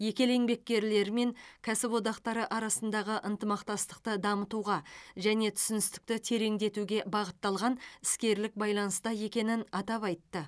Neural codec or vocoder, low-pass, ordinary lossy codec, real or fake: none; none; none; real